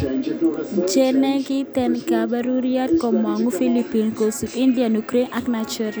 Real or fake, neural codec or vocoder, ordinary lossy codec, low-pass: real; none; none; none